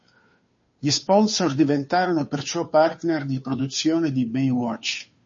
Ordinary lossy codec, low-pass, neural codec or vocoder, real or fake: MP3, 32 kbps; 7.2 kHz; codec, 16 kHz, 2 kbps, FunCodec, trained on Chinese and English, 25 frames a second; fake